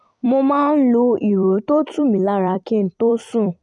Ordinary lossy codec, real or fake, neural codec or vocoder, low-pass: none; fake; vocoder, 44.1 kHz, 128 mel bands every 256 samples, BigVGAN v2; 10.8 kHz